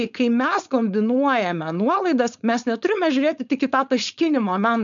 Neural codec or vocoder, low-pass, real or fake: codec, 16 kHz, 4.8 kbps, FACodec; 7.2 kHz; fake